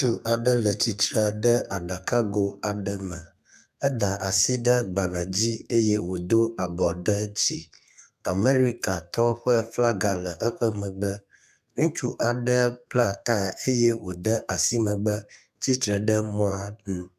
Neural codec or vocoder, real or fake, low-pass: codec, 32 kHz, 1.9 kbps, SNAC; fake; 14.4 kHz